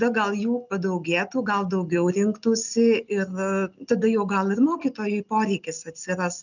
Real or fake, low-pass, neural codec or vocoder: real; 7.2 kHz; none